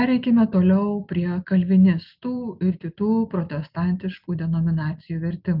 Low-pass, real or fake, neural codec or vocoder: 5.4 kHz; real; none